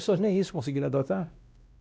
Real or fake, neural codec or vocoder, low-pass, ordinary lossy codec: fake; codec, 16 kHz, 1 kbps, X-Codec, WavLM features, trained on Multilingual LibriSpeech; none; none